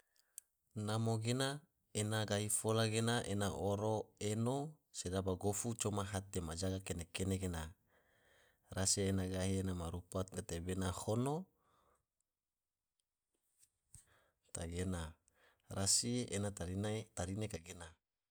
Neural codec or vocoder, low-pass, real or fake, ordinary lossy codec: none; none; real; none